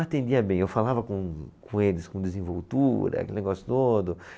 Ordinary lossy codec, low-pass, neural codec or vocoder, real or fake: none; none; none; real